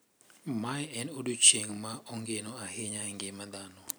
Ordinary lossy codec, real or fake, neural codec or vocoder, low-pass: none; real; none; none